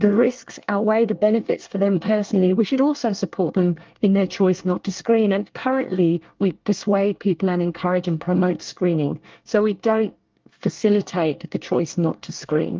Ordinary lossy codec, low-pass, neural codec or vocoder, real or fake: Opus, 32 kbps; 7.2 kHz; codec, 24 kHz, 1 kbps, SNAC; fake